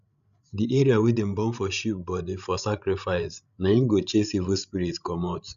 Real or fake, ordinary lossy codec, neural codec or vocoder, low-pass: fake; none; codec, 16 kHz, 16 kbps, FreqCodec, larger model; 7.2 kHz